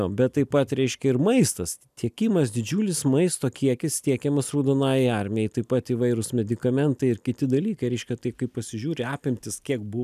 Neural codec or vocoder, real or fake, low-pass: none; real; 14.4 kHz